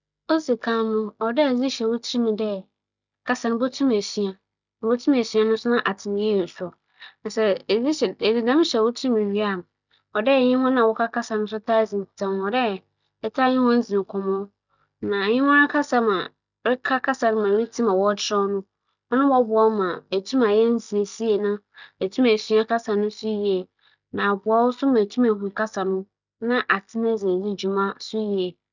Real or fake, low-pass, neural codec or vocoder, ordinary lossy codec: real; 7.2 kHz; none; none